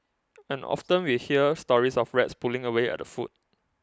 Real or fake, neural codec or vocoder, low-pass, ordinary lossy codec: real; none; none; none